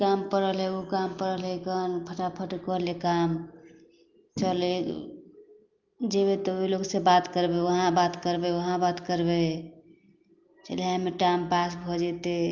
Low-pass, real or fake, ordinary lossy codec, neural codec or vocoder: 7.2 kHz; real; Opus, 32 kbps; none